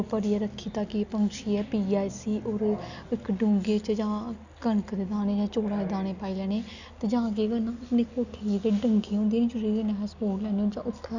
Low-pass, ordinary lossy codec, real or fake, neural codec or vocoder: 7.2 kHz; none; real; none